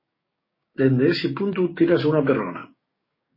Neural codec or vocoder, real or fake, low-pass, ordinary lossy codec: codec, 16 kHz, 6 kbps, DAC; fake; 5.4 kHz; MP3, 24 kbps